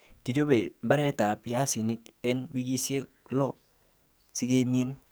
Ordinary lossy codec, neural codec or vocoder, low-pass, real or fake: none; codec, 44.1 kHz, 2.6 kbps, SNAC; none; fake